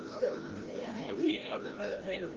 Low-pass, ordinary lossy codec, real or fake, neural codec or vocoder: 7.2 kHz; Opus, 16 kbps; fake; codec, 16 kHz, 0.5 kbps, FreqCodec, larger model